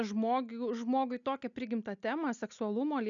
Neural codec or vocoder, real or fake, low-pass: none; real; 7.2 kHz